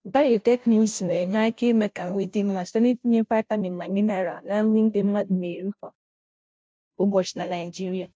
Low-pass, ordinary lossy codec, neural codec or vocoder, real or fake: none; none; codec, 16 kHz, 0.5 kbps, FunCodec, trained on Chinese and English, 25 frames a second; fake